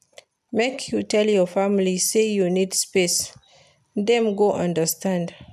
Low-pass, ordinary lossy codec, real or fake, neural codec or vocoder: 14.4 kHz; none; real; none